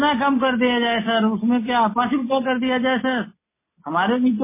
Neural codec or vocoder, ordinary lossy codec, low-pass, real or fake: none; MP3, 16 kbps; 3.6 kHz; real